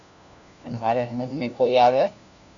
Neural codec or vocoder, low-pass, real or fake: codec, 16 kHz, 1 kbps, FunCodec, trained on LibriTTS, 50 frames a second; 7.2 kHz; fake